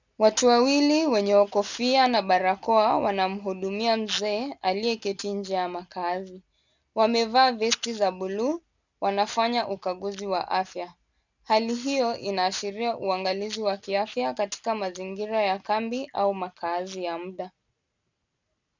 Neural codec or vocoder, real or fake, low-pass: none; real; 7.2 kHz